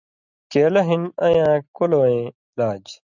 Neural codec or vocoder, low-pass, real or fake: none; 7.2 kHz; real